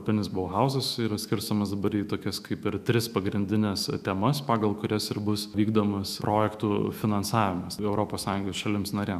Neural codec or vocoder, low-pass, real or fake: autoencoder, 48 kHz, 128 numbers a frame, DAC-VAE, trained on Japanese speech; 14.4 kHz; fake